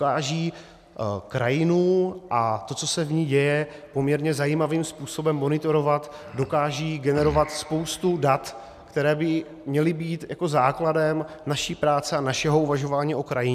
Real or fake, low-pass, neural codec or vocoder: real; 14.4 kHz; none